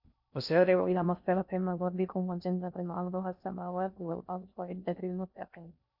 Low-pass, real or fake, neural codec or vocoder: 5.4 kHz; fake; codec, 16 kHz in and 24 kHz out, 0.6 kbps, FocalCodec, streaming, 4096 codes